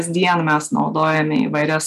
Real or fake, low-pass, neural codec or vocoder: real; 14.4 kHz; none